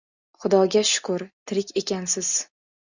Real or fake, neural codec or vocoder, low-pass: real; none; 7.2 kHz